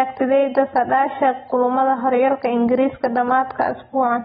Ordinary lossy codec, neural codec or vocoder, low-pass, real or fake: AAC, 16 kbps; none; 19.8 kHz; real